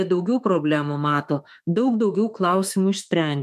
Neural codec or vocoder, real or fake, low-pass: autoencoder, 48 kHz, 32 numbers a frame, DAC-VAE, trained on Japanese speech; fake; 14.4 kHz